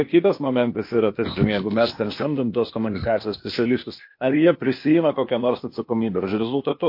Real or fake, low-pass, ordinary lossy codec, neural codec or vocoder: fake; 5.4 kHz; MP3, 32 kbps; codec, 16 kHz, 0.8 kbps, ZipCodec